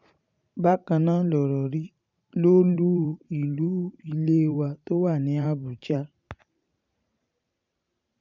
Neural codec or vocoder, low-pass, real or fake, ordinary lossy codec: vocoder, 44.1 kHz, 128 mel bands every 512 samples, BigVGAN v2; 7.2 kHz; fake; none